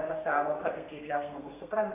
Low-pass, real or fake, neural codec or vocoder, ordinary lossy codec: 3.6 kHz; fake; codec, 32 kHz, 1.9 kbps, SNAC; AAC, 32 kbps